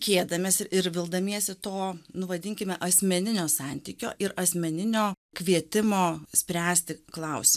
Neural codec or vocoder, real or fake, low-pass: none; real; 14.4 kHz